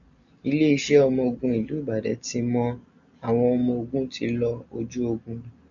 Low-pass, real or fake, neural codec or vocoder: 7.2 kHz; real; none